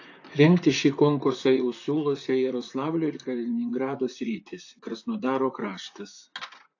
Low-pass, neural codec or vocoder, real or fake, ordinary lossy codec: 7.2 kHz; vocoder, 44.1 kHz, 128 mel bands, Pupu-Vocoder; fake; AAC, 48 kbps